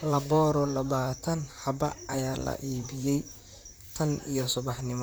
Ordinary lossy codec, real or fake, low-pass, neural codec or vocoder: none; fake; none; vocoder, 44.1 kHz, 128 mel bands, Pupu-Vocoder